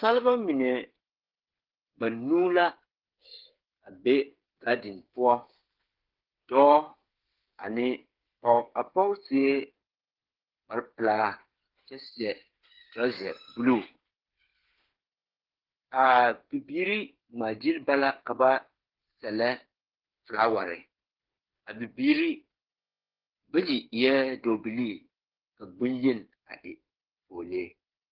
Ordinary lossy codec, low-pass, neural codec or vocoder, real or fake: Opus, 16 kbps; 5.4 kHz; codec, 16 kHz, 8 kbps, FreqCodec, smaller model; fake